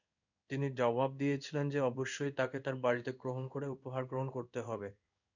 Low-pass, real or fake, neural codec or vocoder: 7.2 kHz; fake; codec, 16 kHz in and 24 kHz out, 1 kbps, XY-Tokenizer